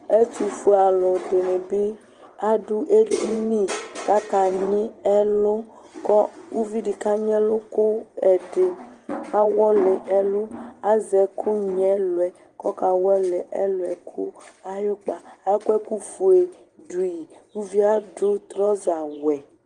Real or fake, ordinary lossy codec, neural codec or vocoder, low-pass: fake; Opus, 24 kbps; vocoder, 24 kHz, 100 mel bands, Vocos; 10.8 kHz